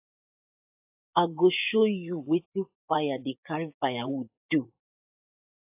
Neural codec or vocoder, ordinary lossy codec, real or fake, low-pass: vocoder, 24 kHz, 100 mel bands, Vocos; AAC, 32 kbps; fake; 3.6 kHz